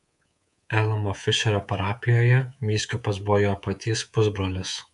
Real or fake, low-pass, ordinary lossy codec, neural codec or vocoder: fake; 10.8 kHz; AAC, 96 kbps; codec, 24 kHz, 3.1 kbps, DualCodec